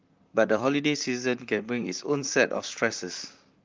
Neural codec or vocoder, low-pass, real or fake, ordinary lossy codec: vocoder, 44.1 kHz, 128 mel bands, Pupu-Vocoder; 7.2 kHz; fake; Opus, 24 kbps